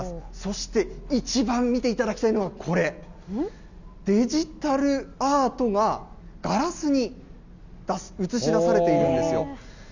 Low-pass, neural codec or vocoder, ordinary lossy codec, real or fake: 7.2 kHz; none; none; real